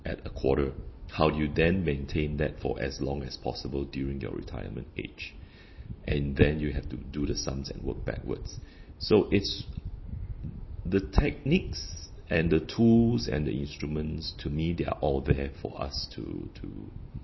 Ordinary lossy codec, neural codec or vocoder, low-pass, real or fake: MP3, 24 kbps; none; 7.2 kHz; real